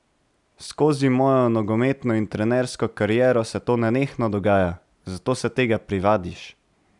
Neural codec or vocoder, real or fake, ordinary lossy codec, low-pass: none; real; none; 10.8 kHz